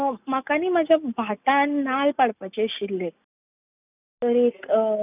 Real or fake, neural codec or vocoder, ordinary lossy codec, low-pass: real; none; none; 3.6 kHz